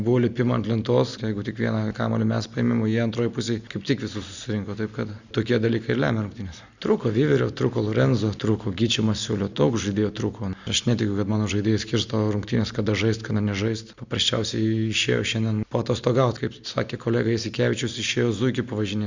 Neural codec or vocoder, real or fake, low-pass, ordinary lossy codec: none; real; 7.2 kHz; Opus, 64 kbps